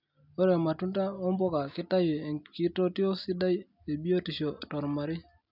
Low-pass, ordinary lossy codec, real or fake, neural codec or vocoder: 5.4 kHz; none; real; none